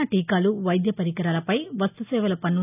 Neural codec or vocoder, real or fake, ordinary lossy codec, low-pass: none; real; none; 3.6 kHz